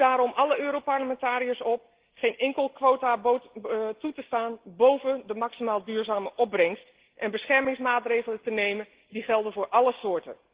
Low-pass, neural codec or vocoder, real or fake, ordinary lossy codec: 3.6 kHz; none; real; Opus, 16 kbps